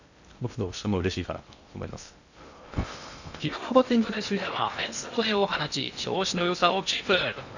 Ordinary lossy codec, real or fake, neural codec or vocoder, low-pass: none; fake; codec, 16 kHz in and 24 kHz out, 0.6 kbps, FocalCodec, streaming, 2048 codes; 7.2 kHz